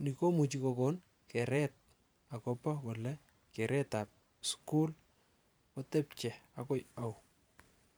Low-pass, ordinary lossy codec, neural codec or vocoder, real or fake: none; none; vocoder, 44.1 kHz, 128 mel bands every 512 samples, BigVGAN v2; fake